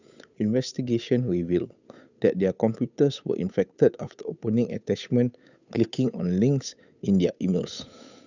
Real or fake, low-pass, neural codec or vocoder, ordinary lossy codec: fake; 7.2 kHz; codec, 16 kHz, 8 kbps, FunCodec, trained on Chinese and English, 25 frames a second; none